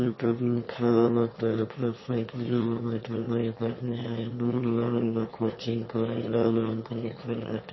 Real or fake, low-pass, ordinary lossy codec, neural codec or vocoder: fake; 7.2 kHz; MP3, 24 kbps; autoencoder, 22.05 kHz, a latent of 192 numbers a frame, VITS, trained on one speaker